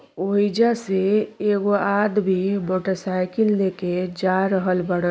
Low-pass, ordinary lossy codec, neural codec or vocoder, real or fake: none; none; none; real